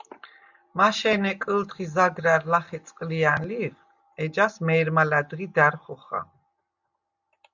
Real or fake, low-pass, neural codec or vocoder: real; 7.2 kHz; none